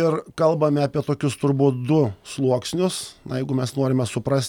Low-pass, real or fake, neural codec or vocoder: 19.8 kHz; real; none